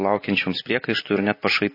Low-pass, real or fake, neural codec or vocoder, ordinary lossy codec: 5.4 kHz; real; none; MP3, 24 kbps